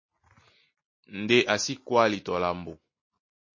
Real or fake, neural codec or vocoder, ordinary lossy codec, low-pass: real; none; MP3, 32 kbps; 7.2 kHz